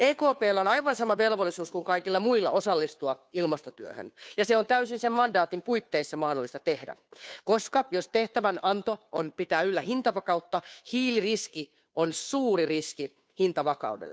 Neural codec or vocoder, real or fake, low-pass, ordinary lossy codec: codec, 16 kHz, 2 kbps, FunCodec, trained on Chinese and English, 25 frames a second; fake; none; none